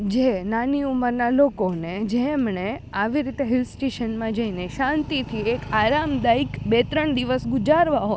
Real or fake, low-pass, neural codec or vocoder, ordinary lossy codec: real; none; none; none